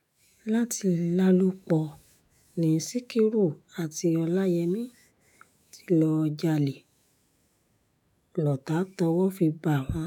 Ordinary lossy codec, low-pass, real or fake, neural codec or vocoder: none; 19.8 kHz; fake; autoencoder, 48 kHz, 128 numbers a frame, DAC-VAE, trained on Japanese speech